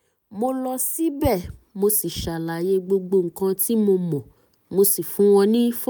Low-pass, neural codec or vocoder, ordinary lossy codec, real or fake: none; none; none; real